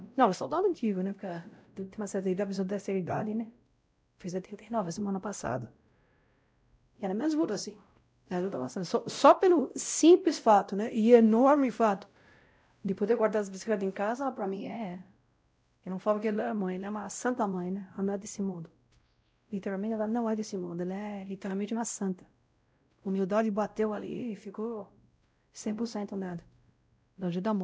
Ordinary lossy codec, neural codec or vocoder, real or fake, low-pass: none; codec, 16 kHz, 0.5 kbps, X-Codec, WavLM features, trained on Multilingual LibriSpeech; fake; none